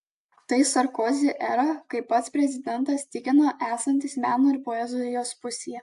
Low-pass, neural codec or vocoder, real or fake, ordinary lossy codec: 10.8 kHz; none; real; AAC, 64 kbps